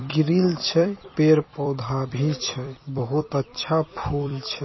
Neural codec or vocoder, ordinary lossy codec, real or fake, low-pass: vocoder, 44.1 kHz, 128 mel bands every 256 samples, BigVGAN v2; MP3, 24 kbps; fake; 7.2 kHz